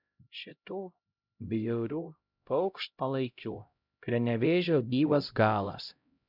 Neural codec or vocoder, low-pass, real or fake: codec, 16 kHz, 0.5 kbps, X-Codec, HuBERT features, trained on LibriSpeech; 5.4 kHz; fake